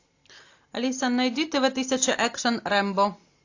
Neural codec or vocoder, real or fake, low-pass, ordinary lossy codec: none; real; 7.2 kHz; AAC, 48 kbps